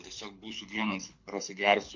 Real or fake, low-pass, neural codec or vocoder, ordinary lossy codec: fake; 7.2 kHz; codec, 24 kHz, 6 kbps, HILCodec; MP3, 48 kbps